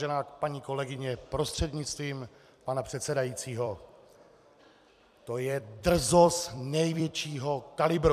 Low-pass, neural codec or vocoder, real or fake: 14.4 kHz; vocoder, 44.1 kHz, 128 mel bands every 256 samples, BigVGAN v2; fake